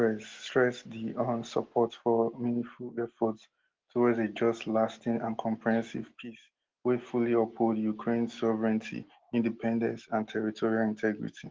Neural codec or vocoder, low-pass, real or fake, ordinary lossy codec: none; 7.2 kHz; real; Opus, 16 kbps